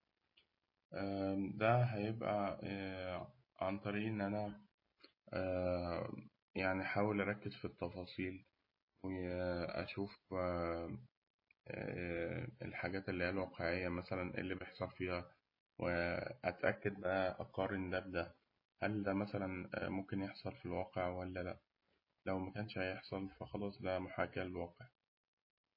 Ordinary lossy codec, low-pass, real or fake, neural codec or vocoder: MP3, 24 kbps; 5.4 kHz; real; none